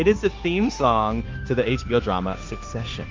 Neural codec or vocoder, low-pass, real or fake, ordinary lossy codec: codec, 16 kHz, 0.9 kbps, LongCat-Audio-Codec; 7.2 kHz; fake; Opus, 24 kbps